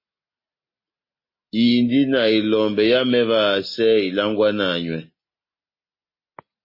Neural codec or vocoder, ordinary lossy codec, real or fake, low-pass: none; MP3, 24 kbps; real; 5.4 kHz